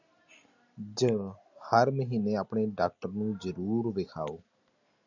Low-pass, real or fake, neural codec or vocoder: 7.2 kHz; real; none